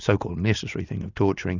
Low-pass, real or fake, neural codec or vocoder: 7.2 kHz; real; none